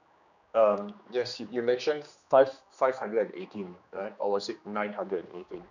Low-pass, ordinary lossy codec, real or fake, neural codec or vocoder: 7.2 kHz; none; fake; codec, 16 kHz, 2 kbps, X-Codec, HuBERT features, trained on general audio